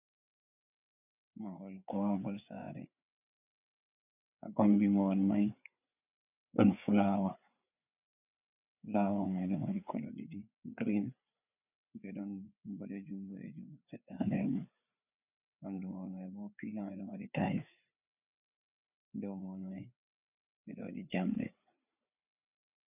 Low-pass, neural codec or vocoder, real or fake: 3.6 kHz; codec, 16 kHz, 4 kbps, FreqCodec, larger model; fake